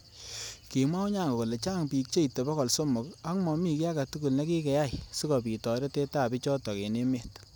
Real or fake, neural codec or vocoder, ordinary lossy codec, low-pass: real; none; none; none